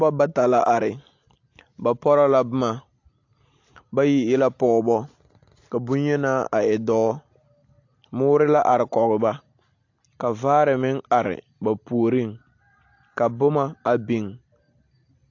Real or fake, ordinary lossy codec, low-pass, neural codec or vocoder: real; AAC, 48 kbps; 7.2 kHz; none